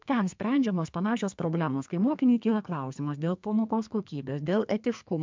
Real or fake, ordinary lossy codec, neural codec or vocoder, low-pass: fake; MP3, 64 kbps; codec, 32 kHz, 1.9 kbps, SNAC; 7.2 kHz